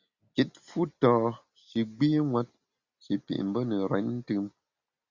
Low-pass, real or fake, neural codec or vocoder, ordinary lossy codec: 7.2 kHz; real; none; Opus, 64 kbps